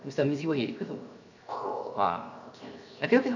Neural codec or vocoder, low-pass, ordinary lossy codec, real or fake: codec, 16 kHz, 0.7 kbps, FocalCodec; 7.2 kHz; none; fake